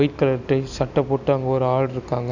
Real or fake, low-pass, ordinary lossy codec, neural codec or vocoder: real; 7.2 kHz; none; none